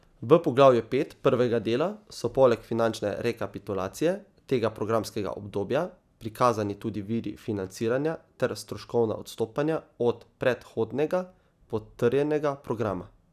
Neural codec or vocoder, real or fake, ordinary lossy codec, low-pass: none; real; none; 14.4 kHz